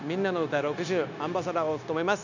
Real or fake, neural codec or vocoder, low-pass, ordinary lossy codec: fake; codec, 16 kHz, 0.9 kbps, LongCat-Audio-Codec; 7.2 kHz; none